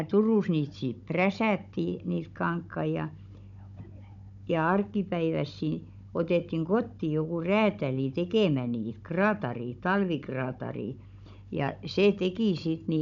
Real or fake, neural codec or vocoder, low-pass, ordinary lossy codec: fake; codec, 16 kHz, 16 kbps, FunCodec, trained on Chinese and English, 50 frames a second; 7.2 kHz; none